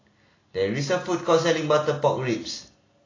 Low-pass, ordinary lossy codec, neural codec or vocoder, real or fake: 7.2 kHz; AAC, 32 kbps; none; real